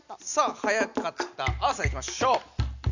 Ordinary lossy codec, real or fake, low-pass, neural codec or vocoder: none; real; 7.2 kHz; none